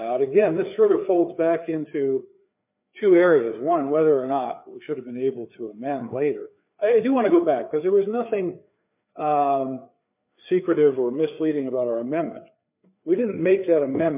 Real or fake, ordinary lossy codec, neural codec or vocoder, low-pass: fake; MP3, 32 kbps; codec, 16 kHz, 4 kbps, FreqCodec, larger model; 3.6 kHz